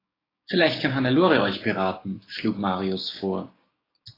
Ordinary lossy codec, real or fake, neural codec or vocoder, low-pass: AAC, 24 kbps; fake; codec, 16 kHz, 6 kbps, DAC; 5.4 kHz